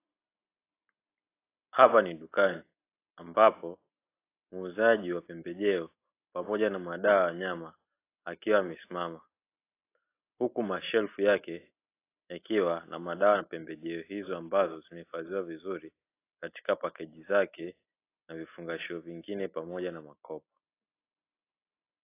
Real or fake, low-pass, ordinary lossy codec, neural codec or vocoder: real; 3.6 kHz; AAC, 24 kbps; none